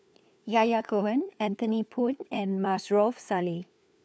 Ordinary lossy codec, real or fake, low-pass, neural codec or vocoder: none; fake; none; codec, 16 kHz, 4 kbps, FunCodec, trained on LibriTTS, 50 frames a second